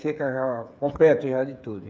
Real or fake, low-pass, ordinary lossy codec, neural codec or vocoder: fake; none; none; codec, 16 kHz, 8 kbps, FreqCodec, smaller model